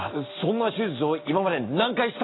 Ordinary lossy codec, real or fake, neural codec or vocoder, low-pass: AAC, 16 kbps; real; none; 7.2 kHz